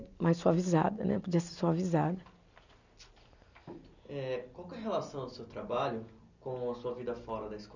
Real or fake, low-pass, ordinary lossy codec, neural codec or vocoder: real; 7.2 kHz; none; none